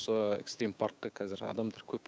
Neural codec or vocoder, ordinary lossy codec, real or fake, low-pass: codec, 16 kHz, 6 kbps, DAC; none; fake; none